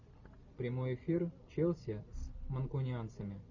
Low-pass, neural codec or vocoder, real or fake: 7.2 kHz; none; real